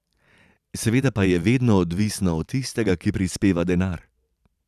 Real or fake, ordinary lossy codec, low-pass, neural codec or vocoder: fake; none; 14.4 kHz; vocoder, 44.1 kHz, 128 mel bands every 512 samples, BigVGAN v2